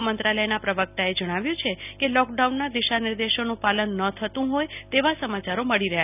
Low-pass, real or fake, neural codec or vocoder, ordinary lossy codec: 3.6 kHz; real; none; none